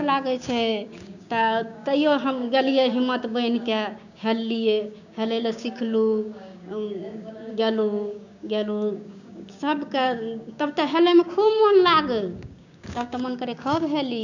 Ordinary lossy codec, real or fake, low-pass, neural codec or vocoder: none; fake; 7.2 kHz; codec, 16 kHz, 6 kbps, DAC